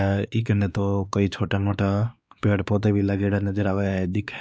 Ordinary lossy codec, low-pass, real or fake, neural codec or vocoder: none; none; fake; codec, 16 kHz, 2 kbps, X-Codec, WavLM features, trained on Multilingual LibriSpeech